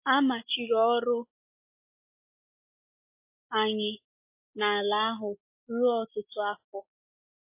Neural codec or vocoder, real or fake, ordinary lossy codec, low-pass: none; real; MP3, 24 kbps; 3.6 kHz